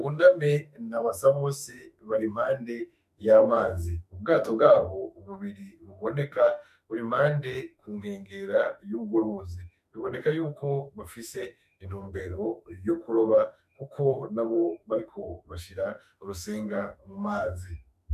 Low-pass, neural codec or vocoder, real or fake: 14.4 kHz; autoencoder, 48 kHz, 32 numbers a frame, DAC-VAE, trained on Japanese speech; fake